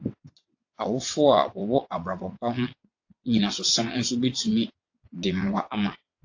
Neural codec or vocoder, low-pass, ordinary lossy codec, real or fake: vocoder, 22.05 kHz, 80 mel bands, WaveNeXt; 7.2 kHz; AAC, 48 kbps; fake